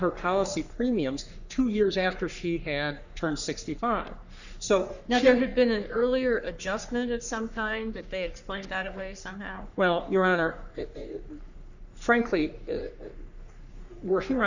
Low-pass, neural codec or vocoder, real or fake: 7.2 kHz; codec, 44.1 kHz, 3.4 kbps, Pupu-Codec; fake